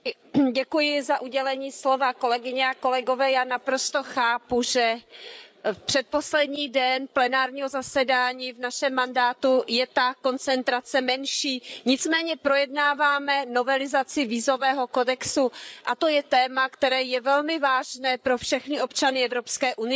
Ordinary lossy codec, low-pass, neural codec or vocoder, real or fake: none; none; codec, 16 kHz, 8 kbps, FreqCodec, larger model; fake